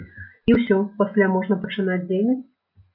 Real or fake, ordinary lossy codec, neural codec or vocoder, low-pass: real; MP3, 48 kbps; none; 5.4 kHz